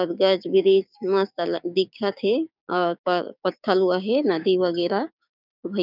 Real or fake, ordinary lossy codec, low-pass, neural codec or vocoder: fake; none; 5.4 kHz; codec, 16 kHz, 6 kbps, DAC